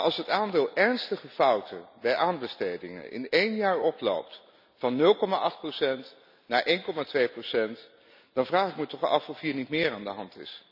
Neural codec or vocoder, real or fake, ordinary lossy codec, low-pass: none; real; none; 5.4 kHz